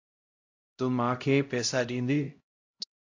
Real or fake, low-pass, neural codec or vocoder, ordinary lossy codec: fake; 7.2 kHz; codec, 16 kHz, 0.5 kbps, X-Codec, HuBERT features, trained on LibriSpeech; AAC, 48 kbps